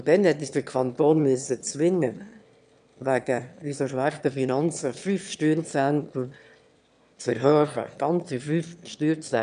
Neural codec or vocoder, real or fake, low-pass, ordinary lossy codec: autoencoder, 22.05 kHz, a latent of 192 numbers a frame, VITS, trained on one speaker; fake; 9.9 kHz; none